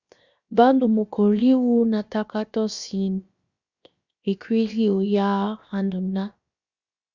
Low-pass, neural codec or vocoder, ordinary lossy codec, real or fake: 7.2 kHz; codec, 16 kHz, 0.7 kbps, FocalCodec; Opus, 64 kbps; fake